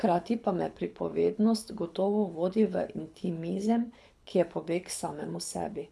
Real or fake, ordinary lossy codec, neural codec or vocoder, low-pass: fake; none; codec, 24 kHz, 6 kbps, HILCodec; none